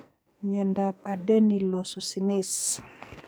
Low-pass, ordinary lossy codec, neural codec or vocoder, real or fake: none; none; codec, 44.1 kHz, 2.6 kbps, SNAC; fake